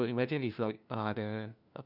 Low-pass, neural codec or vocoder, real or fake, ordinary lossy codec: 5.4 kHz; codec, 16 kHz, 1 kbps, FunCodec, trained on LibriTTS, 50 frames a second; fake; none